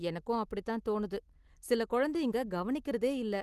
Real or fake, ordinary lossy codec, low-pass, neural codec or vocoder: real; Opus, 24 kbps; 14.4 kHz; none